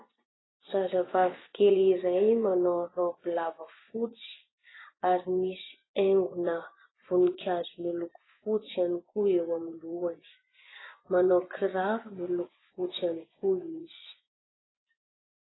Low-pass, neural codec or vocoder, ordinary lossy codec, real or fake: 7.2 kHz; vocoder, 24 kHz, 100 mel bands, Vocos; AAC, 16 kbps; fake